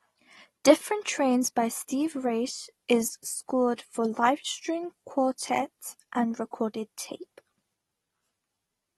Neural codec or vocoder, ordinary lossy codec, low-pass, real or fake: none; AAC, 32 kbps; 19.8 kHz; real